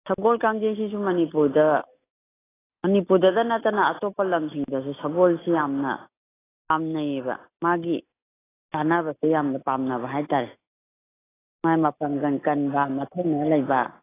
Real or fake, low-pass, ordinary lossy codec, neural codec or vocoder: real; 3.6 kHz; AAC, 16 kbps; none